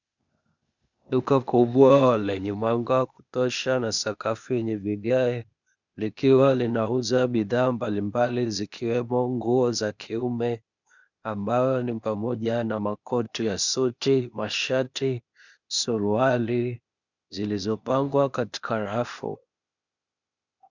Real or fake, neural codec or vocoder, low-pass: fake; codec, 16 kHz, 0.8 kbps, ZipCodec; 7.2 kHz